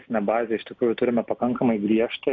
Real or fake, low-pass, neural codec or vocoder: real; 7.2 kHz; none